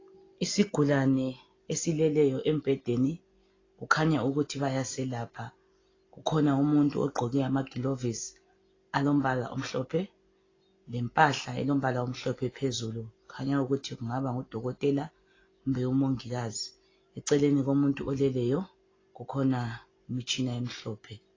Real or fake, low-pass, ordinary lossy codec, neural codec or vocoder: real; 7.2 kHz; AAC, 32 kbps; none